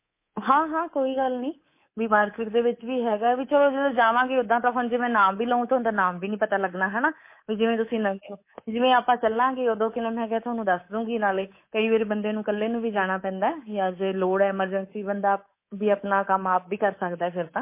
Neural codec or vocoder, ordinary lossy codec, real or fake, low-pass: codec, 24 kHz, 3.1 kbps, DualCodec; MP3, 24 kbps; fake; 3.6 kHz